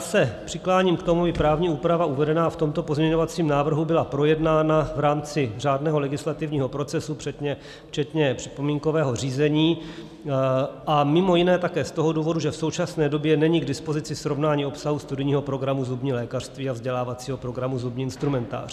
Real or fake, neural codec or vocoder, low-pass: real; none; 14.4 kHz